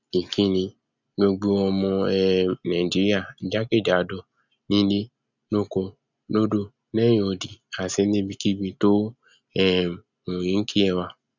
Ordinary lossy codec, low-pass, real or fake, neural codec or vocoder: none; 7.2 kHz; real; none